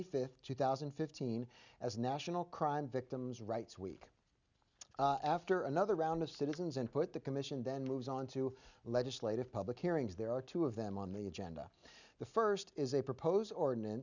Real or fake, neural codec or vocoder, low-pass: real; none; 7.2 kHz